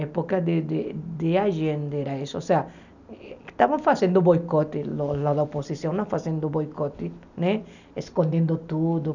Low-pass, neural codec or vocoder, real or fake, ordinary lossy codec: 7.2 kHz; none; real; none